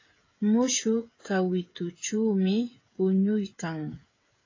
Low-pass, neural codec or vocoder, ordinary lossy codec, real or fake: 7.2 kHz; codec, 16 kHz, 16 kbps, FreqCodec, smaller model; AAC, 32 kbps; fake